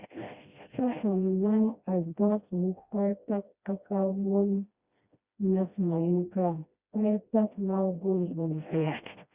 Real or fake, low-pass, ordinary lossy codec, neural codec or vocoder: fake; 3.6 kHz; Opus, 64 kbps; codec, 16 kHz, 1 kbps, FreqCodec, smaller model